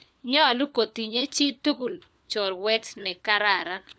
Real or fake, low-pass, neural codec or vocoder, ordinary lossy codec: fake; none; codec, 16 kHz, 4 kbps, FunCodec, trained on LibriTTS, 50 frames a second; none